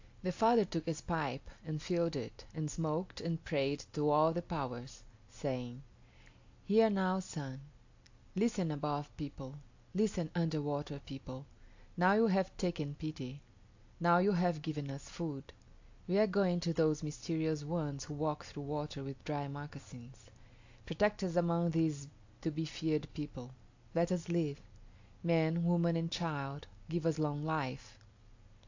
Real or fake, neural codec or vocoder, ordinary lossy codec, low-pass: real; none; AAC, 48 kbps; 7.2 kHz